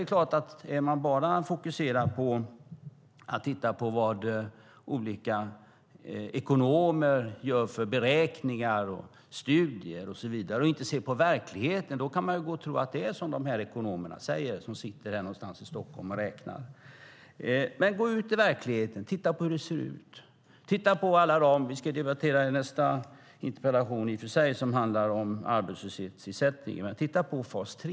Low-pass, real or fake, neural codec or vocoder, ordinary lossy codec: none; real; none; none